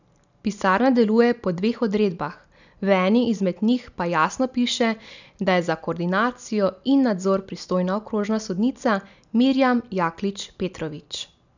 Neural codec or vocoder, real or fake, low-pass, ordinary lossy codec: none; real; 7.2 kHz; none